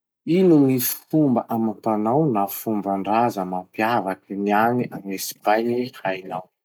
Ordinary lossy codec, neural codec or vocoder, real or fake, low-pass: none; none; real; none